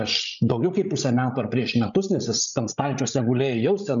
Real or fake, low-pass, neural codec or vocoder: fake; 7.2 kHz; codec, 16 kHz, 16 kbps, FreqCodec, larger model